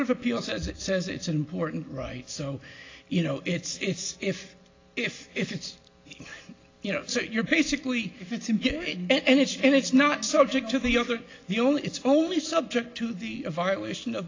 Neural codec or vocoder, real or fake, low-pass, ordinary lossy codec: none; real; 7.2 kHz; AAC, 32 kbps